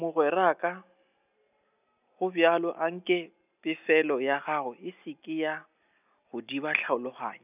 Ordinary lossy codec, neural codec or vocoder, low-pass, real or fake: none; none; 3.6 kHz; real